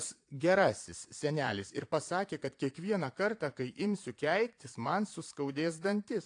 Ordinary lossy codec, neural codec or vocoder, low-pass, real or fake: AAC, 48 kbps; vocoder, 22.05 kHz, 80 mel bands, Vocos; 9.9 kHz; fake